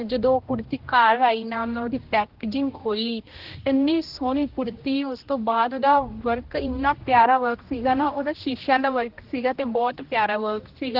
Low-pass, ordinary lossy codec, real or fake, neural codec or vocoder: 5.4 kHz; Opus, 16 kbps; fake; codec, 16 kHz, 1 kbps, X-Codec, HuBERT features, trained on general audio